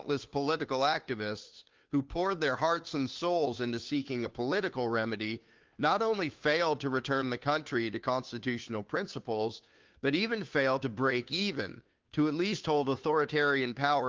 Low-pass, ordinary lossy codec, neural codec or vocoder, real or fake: 7.2 kHz; Opus, 32 kbps; codec, 16 kHz, 2 kbps, FunCodec, trained on Chinese and English, 25 frames a second; fake